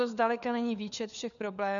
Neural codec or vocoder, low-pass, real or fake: codec, 16 kHz, 4 kbps, FunCodec, trained on LibriTTS, 50 frames a second; 7.2 kHz; fake